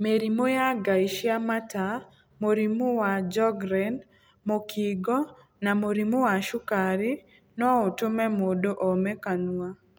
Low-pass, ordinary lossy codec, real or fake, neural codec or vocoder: none; none; real; none